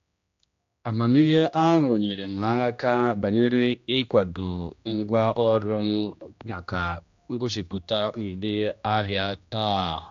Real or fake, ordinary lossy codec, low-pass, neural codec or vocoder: fake; AAC, 64 kbps; 7.2 kHz; codec, 16 kHz, 1 kbps, X-Codec, HuBERT features, trained on general audio